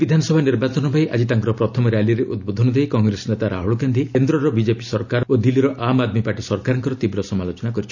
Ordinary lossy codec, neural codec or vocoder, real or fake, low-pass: none; none; real; 7.2 kHz